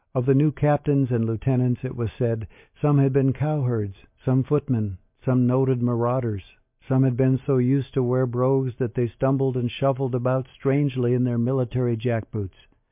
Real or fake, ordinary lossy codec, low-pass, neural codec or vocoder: real; MP3, 32 kbps; 3.6 kHz; none